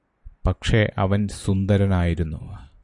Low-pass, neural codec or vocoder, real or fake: 10.8 kHz; none; real